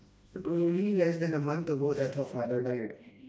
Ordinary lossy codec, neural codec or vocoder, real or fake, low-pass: none; codec, 16 kHz, 1 kbps, FreqCodec, smaller model; fake; none